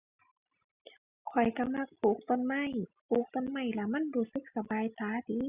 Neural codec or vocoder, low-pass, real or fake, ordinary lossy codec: none; 3.6 kHz; real; Opus, 64 kbps